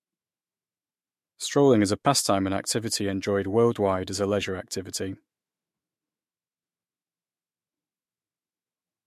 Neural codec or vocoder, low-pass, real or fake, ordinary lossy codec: autoencoder, 48 kHz, 128 numbers a frame, DAC-VAE, trained on Japanese speech; 14.4 kHz; fake; MP3, 64 kbps